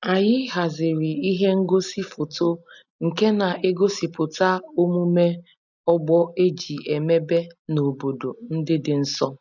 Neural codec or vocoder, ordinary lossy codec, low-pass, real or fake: none; none; 7.2 kHz; real